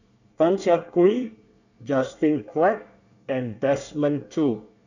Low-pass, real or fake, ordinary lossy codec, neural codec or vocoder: 7.2 kHz; fake; none; codec, 24 kHz, 1 kbps, SNAC